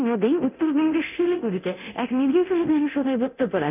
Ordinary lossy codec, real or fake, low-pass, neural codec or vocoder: none; fake; 3.6 kHz; codec, 24 kHz, 0.9 kbps, DualCodec